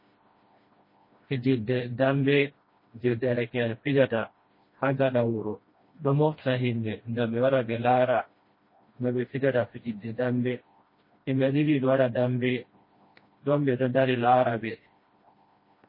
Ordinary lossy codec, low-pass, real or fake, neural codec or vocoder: MP3, 24 kbps; 5.4 kHz; fake; codec, 16 kHz, 1 kbps, FreqCodec, smaller model